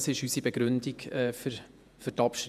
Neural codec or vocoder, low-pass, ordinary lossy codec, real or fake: vocoder, 48 kHz, 128 mel bands, Vocos; 14.4 kHz; none; fake